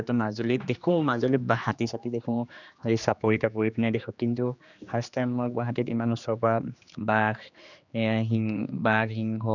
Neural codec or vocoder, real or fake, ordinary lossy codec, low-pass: codec, 16 kHz, 2 kbps, X-Codec, HuBERT features, trained on general audio; fake; none; 7.2 kHz